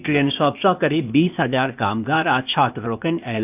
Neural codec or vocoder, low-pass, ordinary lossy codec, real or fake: codec, 16 kHz, 0.8 kbps, ZipCodec; 3.6 kHz; none; fake